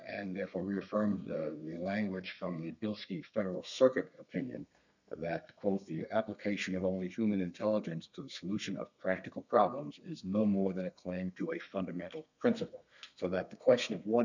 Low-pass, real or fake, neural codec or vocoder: 7.2 kHz; fake; codec, 32 kHz, 1.9 kbps, SNAC